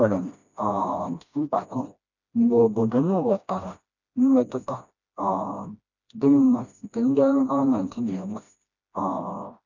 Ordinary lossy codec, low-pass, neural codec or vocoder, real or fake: none; 7.2 kHz; codec, 16 kHz, 1 kbps, FreqCodec, smaller model; fake